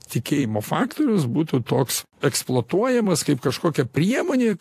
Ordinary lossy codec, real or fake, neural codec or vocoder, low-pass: AAC, 64 kbps; fake; vocoder, 44.1 kHz, 128 mel bands every 256 samples, BigVGAN v2; 14.4 kHz